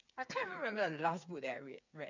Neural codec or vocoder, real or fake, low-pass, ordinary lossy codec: codec, 16 kHz, 8 kbps, FreqCodec, smaller model; fake; 7.2 kHz; none